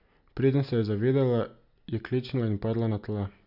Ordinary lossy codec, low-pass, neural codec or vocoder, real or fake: none; 5.4 kHz; none; real